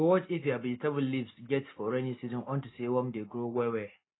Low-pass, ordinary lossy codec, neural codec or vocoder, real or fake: 7.2 kHz; AAC, 16 kbps; none; real